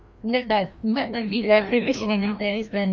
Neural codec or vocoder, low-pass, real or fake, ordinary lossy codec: codec, 16 kHz, 1 kbps, FreqCodec, larger model; none; fake; none